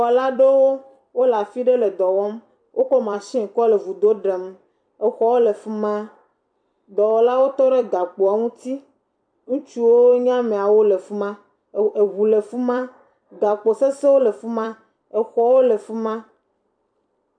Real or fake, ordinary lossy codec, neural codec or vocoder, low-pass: fake; MP3, 64 kbps; vocoder, 44.1 kHz, 128 mel bands every 256 samples, BigVGAN v2; 9.9 kHz